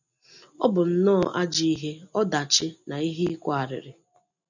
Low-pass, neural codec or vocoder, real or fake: 7.2 kHz; none; real